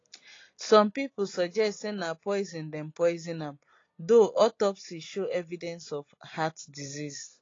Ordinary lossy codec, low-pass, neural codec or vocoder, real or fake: AAC, 32 kbps; 7.2 kHz; none; real